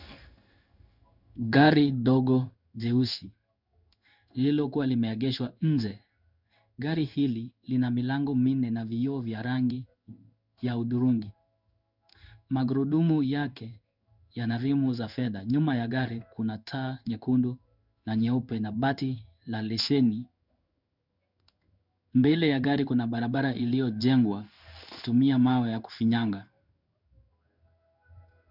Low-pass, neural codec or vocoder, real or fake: 5.4 kHz; codec, 16 kHz in and 24 kHz out, 1 kbps, XY-Tokenizer; fake